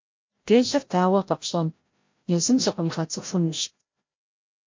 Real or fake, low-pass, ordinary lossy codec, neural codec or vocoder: fake; 7.2 kHz; MP3, 48 kbps; codec, 16 kHz, 0.5 kbps, FreqCodec, larger model